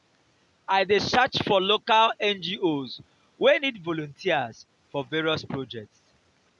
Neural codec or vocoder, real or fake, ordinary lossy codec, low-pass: none; real; none; 10.8 kHz